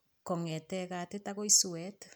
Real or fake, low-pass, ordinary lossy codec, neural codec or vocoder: real; none; none; none